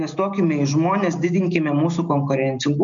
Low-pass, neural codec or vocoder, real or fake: 7.2 kHz; none; real